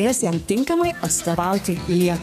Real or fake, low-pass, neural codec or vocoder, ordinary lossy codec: fake; 14.4 kHz; codec, 32 kHz, 1.9 kbps, SNAC; MP3, 96 kbps